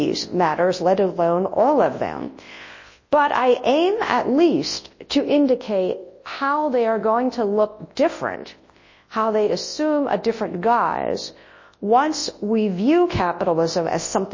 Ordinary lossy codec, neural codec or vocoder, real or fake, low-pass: MP3, 32 kbps; codec, 24 kHz, 0.9 kbps, WavTokenizer, large speech release; fake; 7.2 kHz